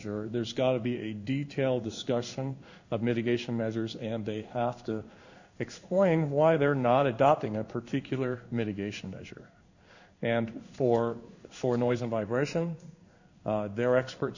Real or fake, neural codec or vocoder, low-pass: fake; codec, 16 kHz in and 24 kHz out, 1 kbps, XY-Tokenizer; 7.2 kHz